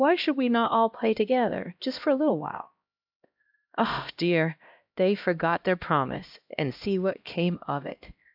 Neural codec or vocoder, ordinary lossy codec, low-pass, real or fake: codec, 16 kHz, 1 kbps, X-Codec, HuBERT features, trained on LibriSpeech; AAC, 48 kbps; 5.4 kHz; fake